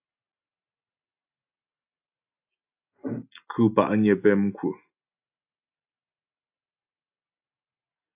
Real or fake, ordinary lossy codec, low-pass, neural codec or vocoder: real; AAC, 32 kbps; 3.6 kHz; none